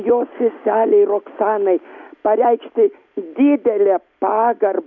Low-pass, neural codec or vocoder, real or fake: 7.2 kHz; none; real